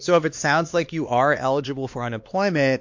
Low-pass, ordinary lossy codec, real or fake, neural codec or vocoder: 7.2 kHz; MP3, 48 kbps; fake; codec, 16 kHz, 2 kbps, X-Codec, HuBERT features, trained on LibriSpeech